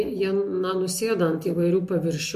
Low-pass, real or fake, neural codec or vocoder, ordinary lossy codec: 14.4 kHz; real; none; MP3, 64 kbps